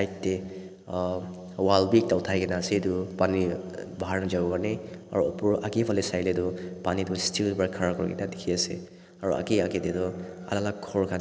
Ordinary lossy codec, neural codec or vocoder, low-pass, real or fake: none; none; none; real